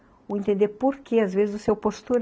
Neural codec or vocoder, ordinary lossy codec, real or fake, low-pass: none; none; real; none